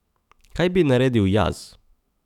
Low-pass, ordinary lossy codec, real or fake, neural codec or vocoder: 19.8 kHz; none; fake; autoencoder, 48 kHz, 128 numbers a frame, DAC-VAE, trained on Japanese speech